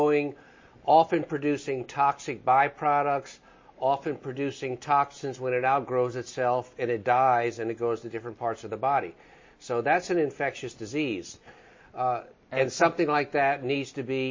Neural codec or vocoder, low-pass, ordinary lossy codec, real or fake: none; 7.2 kHz; MP3, 32 kbps; real